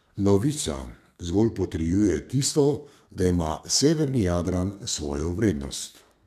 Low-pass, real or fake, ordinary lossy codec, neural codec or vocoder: 14.4 kHz; fake; none; codec, 32 kHz, 1.9 kbps, SNAC